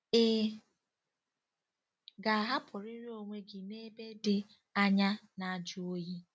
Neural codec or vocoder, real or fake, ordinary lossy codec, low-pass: none; real; none; none